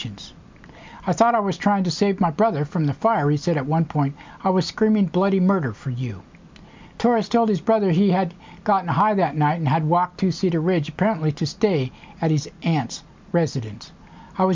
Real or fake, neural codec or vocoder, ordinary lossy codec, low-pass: real; none; MP3, 64 kbps; 7.2 kHz